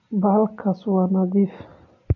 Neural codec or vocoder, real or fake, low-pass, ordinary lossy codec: none; real; 7.2 kHz; AAC, 48 kbps